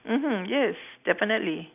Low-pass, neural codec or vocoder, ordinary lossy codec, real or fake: 3.6 kHz; none; none; real